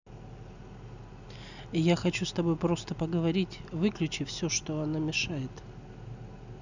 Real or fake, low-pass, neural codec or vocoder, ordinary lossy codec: real; 7.2 kHz; none; none